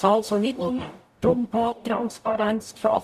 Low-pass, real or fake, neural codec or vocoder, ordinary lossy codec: 14.4 kHz; fake; codec, 44.1 kHz, 0.9 kbps, DAC; none